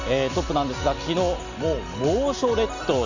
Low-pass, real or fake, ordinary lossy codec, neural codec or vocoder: 7.2 kHz; real; none; none